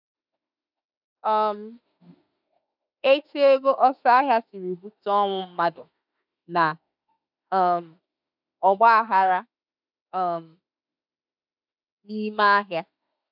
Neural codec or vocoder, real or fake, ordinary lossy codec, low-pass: autoencoder, 48 kHz, 32 numbers a frame, DAC-VAE, trained on Japanese speech; fake; none; 5.4 kHz